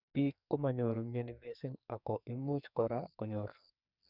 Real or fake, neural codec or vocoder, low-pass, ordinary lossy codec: fake; codec, 44.1 kHz, 2.6 kbps, SNAC; 5.4 kHz; none